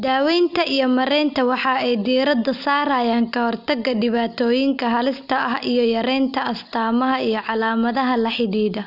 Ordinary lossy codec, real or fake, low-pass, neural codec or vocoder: none; real; 5.4 kHz; none